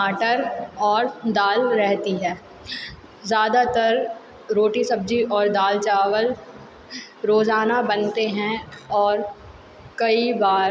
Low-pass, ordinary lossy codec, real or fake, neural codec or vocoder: none; none; real; none